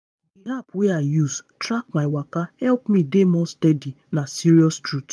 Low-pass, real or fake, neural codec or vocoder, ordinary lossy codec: none; fake; vocoder, 22.05 kHz, 80 mel bands, Vocos; none